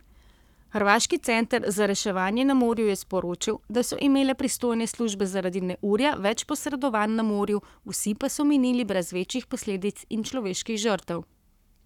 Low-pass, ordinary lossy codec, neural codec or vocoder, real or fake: 19.8 kHz; none; codec, 44.1 kHz, 7.8 kbps, Pupu-Codec; fake